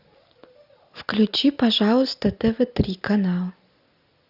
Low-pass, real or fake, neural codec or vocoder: 5.4 kHz; real; none